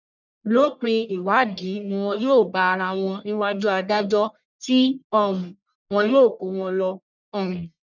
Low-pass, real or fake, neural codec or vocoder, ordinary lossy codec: 7.2 kHz; fake; codec, 44.1 kHz, 1.7 kbps, Pupu-Codec; none